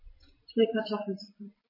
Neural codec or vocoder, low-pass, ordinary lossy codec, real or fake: vocoder, 44.1 kHz, 128 mel bands every 256 samples, BigVGAN v2; 5.4 kHz; MP3, 24 kbps; fake